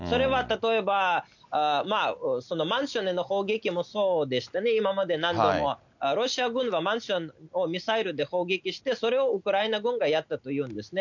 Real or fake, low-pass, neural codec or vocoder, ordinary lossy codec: real; 7.2 kHz; none; none